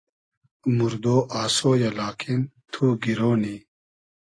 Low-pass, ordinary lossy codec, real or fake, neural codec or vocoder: 9.9 kHz; AAC, 32 kbps; real; none